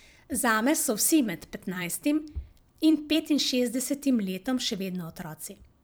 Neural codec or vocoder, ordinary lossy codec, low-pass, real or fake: vocoder, 44.1 kHz, 128 mel bands every 256 samples, BigVGAN v2; none; none; fake